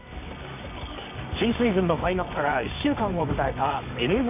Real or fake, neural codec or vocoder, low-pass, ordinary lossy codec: fake; codec, 24 kHz, 0.9 kbps, WavTokenizer, medium music audio release; 3.6 kHz; none